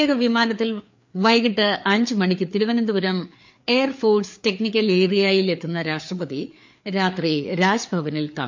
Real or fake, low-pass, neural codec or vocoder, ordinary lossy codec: fake; 7.2 kHz; codec, 16 kHz, 4 kbps, FreqCodec, larger model; MP3, 48 kbps